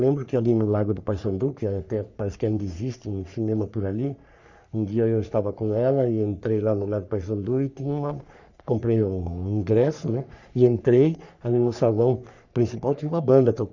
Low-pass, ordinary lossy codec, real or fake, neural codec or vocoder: 7.2 kHz; none; fake; codec, 44.1 kHz, 3.4 kbps, Pupu-Codec